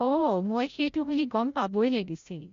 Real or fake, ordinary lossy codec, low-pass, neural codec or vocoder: fake; MP3, 48 kbps; 7.2 kHz; codec, 16 kHz, 0.5 kbps, FreqCodec, larger model